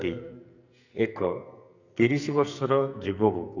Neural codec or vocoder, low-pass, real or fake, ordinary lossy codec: codec, 44.1 kHz, 2.6 kbps, SNAC; 7.2 kHz; fake; none